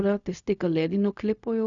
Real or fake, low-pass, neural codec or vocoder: fake; 7.2 kHz; codec, 16 kHz, 0.4 kbps, LongCat-Audio-Codec